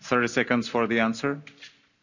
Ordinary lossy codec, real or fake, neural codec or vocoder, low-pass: none; real; none; 7.2 kHz